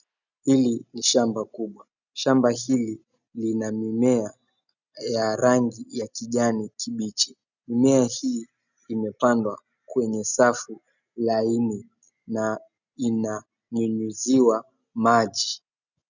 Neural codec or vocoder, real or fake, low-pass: none; real; 7.2 kHz